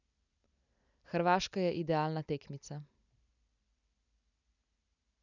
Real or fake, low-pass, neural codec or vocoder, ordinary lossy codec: real; 7.2 kHz; none; none